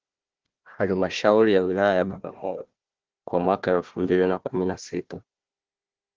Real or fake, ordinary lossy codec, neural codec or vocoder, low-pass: fake; Opus, 16 kbps; codec, 16 kHz, 1 kbps, FunCodec, trained on Chinese and English, 50 frames a second; 7.2 kHz